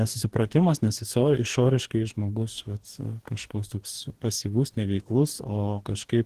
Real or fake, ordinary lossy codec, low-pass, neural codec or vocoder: fake; Opus, 16 kbps; 14.4 kHz; codec, 44.1 kHz, 2.6 kbps, DAC